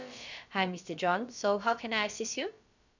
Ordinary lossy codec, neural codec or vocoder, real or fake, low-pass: none; codec, 16 kHz, about 1 kbps, DyCAST, with the encoder's durations; fake; 7.2 kHz